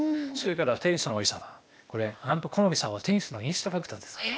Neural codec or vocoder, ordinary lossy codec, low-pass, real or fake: codec, 16 kHz, 0.8 kbps, ZipCodec; none; none; fake